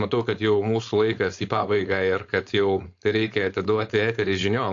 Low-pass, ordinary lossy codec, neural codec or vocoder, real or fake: 7.2 kHz; AAC, 48 kbps; codec, 16 kHz, 4.8 kbps, FACodec; fake